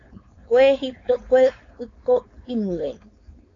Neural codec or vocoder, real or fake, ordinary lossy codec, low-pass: codec, 16 kHz, 4.8 kbps, FACodec; fake; AAC, 32 kbps; 7.2 kHz